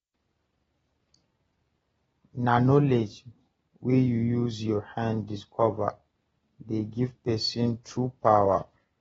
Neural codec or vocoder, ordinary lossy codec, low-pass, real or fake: none; AAC, 24 kbps; 19.8 kHz; real